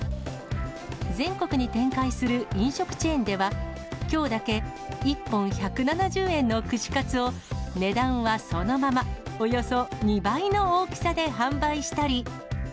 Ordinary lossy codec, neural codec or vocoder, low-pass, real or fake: none; none; none; real